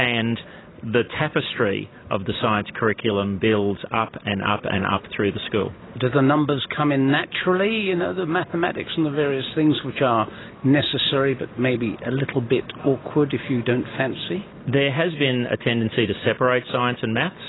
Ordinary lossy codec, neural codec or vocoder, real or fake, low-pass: AAC, 16 kbps; none; real; 7.2 kHz